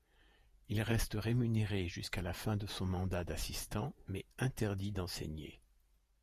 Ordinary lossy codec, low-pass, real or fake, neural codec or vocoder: Opus, 64 kbps; 14.4 kHz; fake; vocoder, 48 kHz, 128 mel bands, Vocos